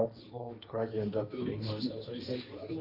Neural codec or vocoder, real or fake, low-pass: codec, 16 kHz, 1.1 kbps, Voila-Tokenizer; fake; 5.4 kHz